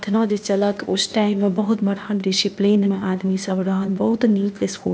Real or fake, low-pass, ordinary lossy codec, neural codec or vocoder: fake; none; none; codec, 16 kHz, 0.8 kbps, ZipCodec